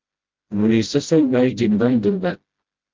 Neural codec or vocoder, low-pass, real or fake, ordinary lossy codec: codec, 16 kHz, 0.5 kbps, FreqCodec, smaller model; 7.2 kHz; fake; Opus, 32 kbps